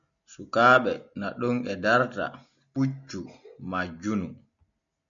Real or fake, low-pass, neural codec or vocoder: real; 7.2 kHz; none